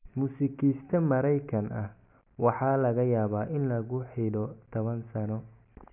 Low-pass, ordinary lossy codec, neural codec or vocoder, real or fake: 3.6 kHz; none; none; real